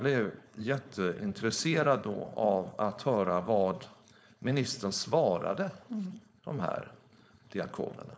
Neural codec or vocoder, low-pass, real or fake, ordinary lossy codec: codec, 16 kHz, 4.8 kbps, FACodec; none; fake; none